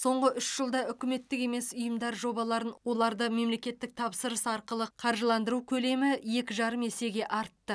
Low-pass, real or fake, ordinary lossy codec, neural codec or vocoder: none; real; none; none